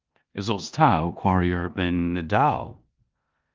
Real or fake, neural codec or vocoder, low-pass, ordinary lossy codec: fake; codec, 16 kHz in and 24 kHz out, 0.9 kbps, LongCat-Audio-Codec, four codebook decoder; 7.2 kHz; Opus, 24 kbps